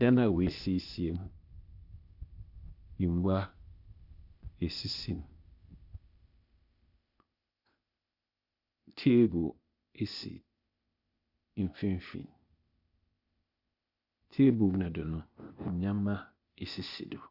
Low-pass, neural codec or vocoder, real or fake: 5.4 kHz; codec, 16 kHz, 0.8 kbps, ZipCodec; fake